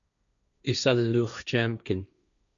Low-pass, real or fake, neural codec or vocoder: 7.2 kHz; fake; codec, 16 kHz, 1.1 kbps, Voila-Tokenizer